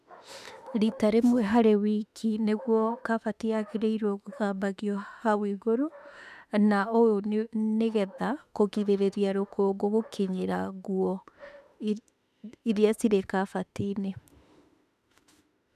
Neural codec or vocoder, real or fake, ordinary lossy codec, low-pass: autoencoder, 48 kHz, 32 numbers a frame, DAC-VAE, trained on Japanese speech; fake; none; 14.4 kHz